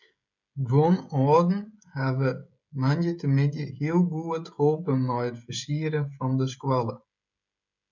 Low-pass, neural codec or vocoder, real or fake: 7.2 kHz; codec, 16 kHz, 16 kbps, FreqCodec, smaller model; fake